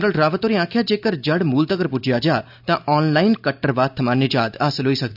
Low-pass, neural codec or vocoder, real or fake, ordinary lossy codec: 5.4 kHz; none; real; none